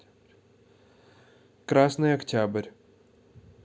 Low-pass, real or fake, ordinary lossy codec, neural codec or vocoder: none; real; none; none